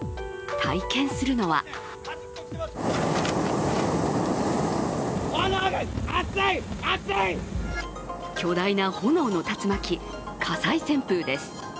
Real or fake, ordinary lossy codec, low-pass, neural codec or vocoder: real; none; none; none